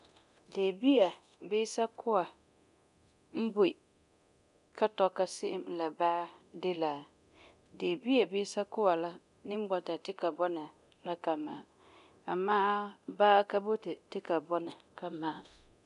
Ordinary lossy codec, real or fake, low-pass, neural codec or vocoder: none; fake; 10.8 kHz; codec, 24 kHz, 0.9 kbps, DualCodec